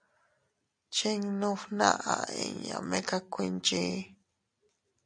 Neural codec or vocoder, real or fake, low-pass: none; real; 9.9 kHz